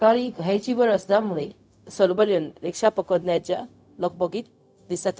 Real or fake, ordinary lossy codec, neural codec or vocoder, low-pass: fake; none; codec, 16 kHz, 0.4 kbps, LongCat-Audio-Codec; none